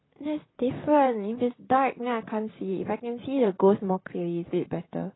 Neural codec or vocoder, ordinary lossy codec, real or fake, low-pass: vocoder, 44.1 kHz, 128 mel bands, Pupu-Vocoder; AAC, 16 kbps; fake; 7.2 kHz